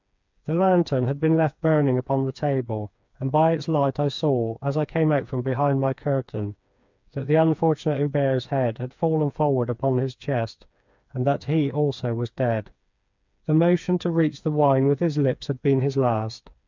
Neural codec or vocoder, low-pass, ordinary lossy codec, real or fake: codec, 16 kHz, 4 kbps, FreqCodec, smaller model; 7.2 kHz; MP3, 64 kbps; fake